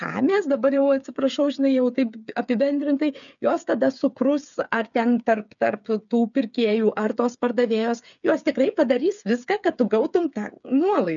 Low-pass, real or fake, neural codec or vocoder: 7.2 kHz; fake; codec, 16 kHz, 8 kbps, FreqCodec, smaller model